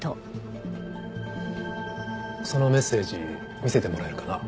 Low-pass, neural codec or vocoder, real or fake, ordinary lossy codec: none; none; real; none